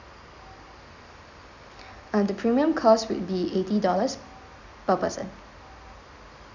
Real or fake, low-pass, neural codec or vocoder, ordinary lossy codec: real; 7.2 kHz; none; none